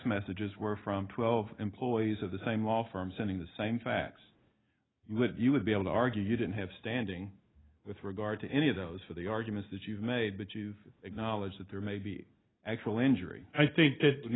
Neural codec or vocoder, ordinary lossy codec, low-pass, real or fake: none; AAC, 16 kbps; 7.2 kHz; real